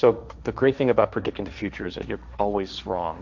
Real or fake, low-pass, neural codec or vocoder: fake; 7.2 kHz; codec, 16 kHz, 1.1 kbps, Voila-Tokenizer